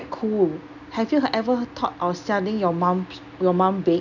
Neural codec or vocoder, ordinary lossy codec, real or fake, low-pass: none; none; real; 7.2 kHz